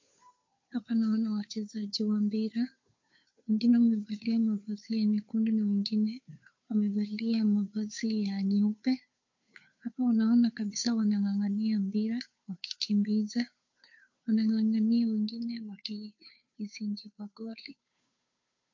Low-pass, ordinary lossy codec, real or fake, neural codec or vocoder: 7.2 kHz; MP3, 48 kbps; fake; codec, 16 kHz, 2 kbps, FunCodec, trained on Chinese and English, 25 frames a second